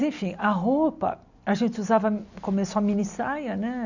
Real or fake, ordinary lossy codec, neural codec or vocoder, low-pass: real; none; none; 7.2 kHz